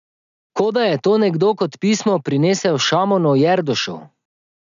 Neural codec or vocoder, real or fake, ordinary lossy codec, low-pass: none; real; none; 7.2 kHz